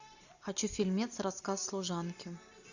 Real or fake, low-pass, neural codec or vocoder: real; 7.2 kHz; none